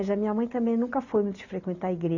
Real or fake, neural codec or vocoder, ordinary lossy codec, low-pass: real; none; none; 7.2 kHz